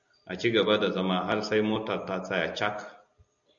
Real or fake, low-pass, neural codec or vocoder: real; 7.2 kHz; none